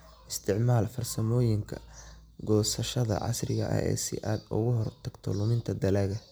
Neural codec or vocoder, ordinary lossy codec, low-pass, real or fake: none; none; none; real